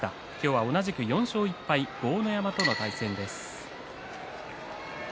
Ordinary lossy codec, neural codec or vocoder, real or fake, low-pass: none; none; real; none